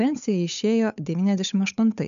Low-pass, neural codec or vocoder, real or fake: 7.2 kHz; codec, 16 kHz, 8 kbps, FunCodec, trained on Chinese and English, 25 frames a second; fake